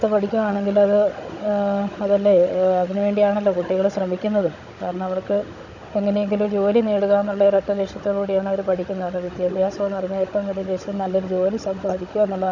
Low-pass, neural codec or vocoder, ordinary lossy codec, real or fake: 7.2 kHz; codec, 16 kHz, 16 kbps, FunCodec, trained on Chinese and English, 50 frames a second; none; fake